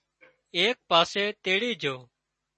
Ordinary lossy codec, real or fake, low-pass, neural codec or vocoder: MP3, 32 kbps; real; 10.8 kHz; none